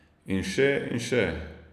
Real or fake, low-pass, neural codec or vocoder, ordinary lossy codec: real; 14.4 kHz; none; none